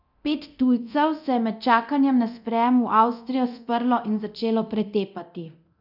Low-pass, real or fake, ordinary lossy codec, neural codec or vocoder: 5.4 kHz; fake; none; codec, 24 kHz, 0.9 kbps, DualCodec